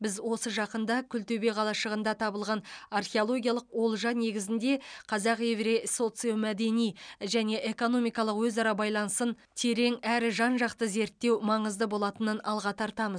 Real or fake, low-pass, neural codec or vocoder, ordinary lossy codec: real; 9.9 kHz; none; none